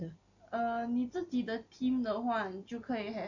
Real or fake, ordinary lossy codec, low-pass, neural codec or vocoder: real; none; 7.2 kHz; none